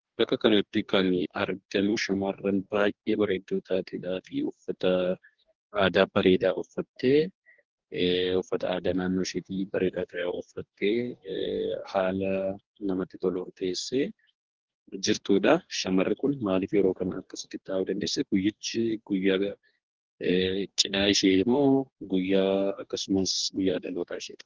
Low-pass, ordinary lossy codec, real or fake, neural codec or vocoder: 7.2 kHz; Opus, 16 kbps; fake; codec, 44.1 kHz, 2.6 kbps, DAC